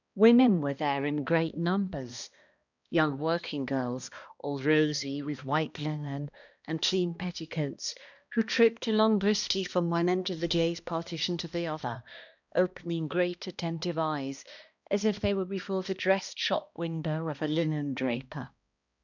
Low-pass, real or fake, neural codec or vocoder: 7.2 kHz; fake; codec, 16 kHz, 1 kbps, X-Codec, HuBERT features, trained on balanced general audio